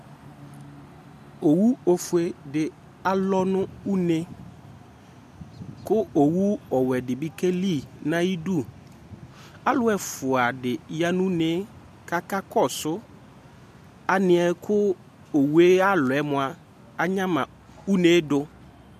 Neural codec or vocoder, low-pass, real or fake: none; 14.4 kHz; real